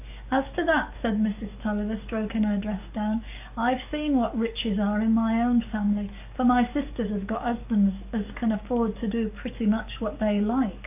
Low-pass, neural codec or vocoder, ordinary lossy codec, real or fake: 3.6 kHz; codec, 44.1 kHz, 7.8 kbps, DAC; AAC, 32 kbps; fake